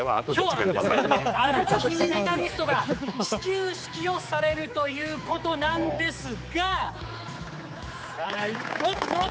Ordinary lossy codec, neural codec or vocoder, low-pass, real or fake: none; codec, 16 kHz, 4 kbps, X-Codec, HuBERT features, trained on general audio; none; fake